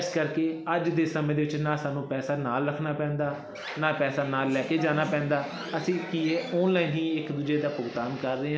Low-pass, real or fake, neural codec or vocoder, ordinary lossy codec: none; real; none; none